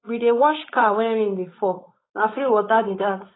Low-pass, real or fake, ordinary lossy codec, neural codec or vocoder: 7.2 kHz; fake; AAC, 16 kbps; codec, 16 kHz, 4.8 kbps, FACodec